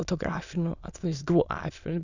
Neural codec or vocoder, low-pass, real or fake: autoencoder, 22.05 kHz, a latent of 192 numbers a frame, VITS, trained on many speakers; 7.2 kHz; fake